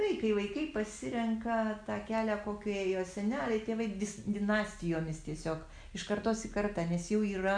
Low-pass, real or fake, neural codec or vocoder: 9.9 kHz; real; none